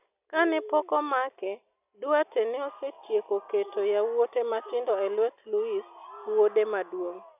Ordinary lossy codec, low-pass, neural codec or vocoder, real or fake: none; 3.6 kHz; none; real